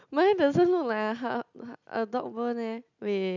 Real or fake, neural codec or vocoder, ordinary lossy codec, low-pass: real; none; none; 7.2 kHz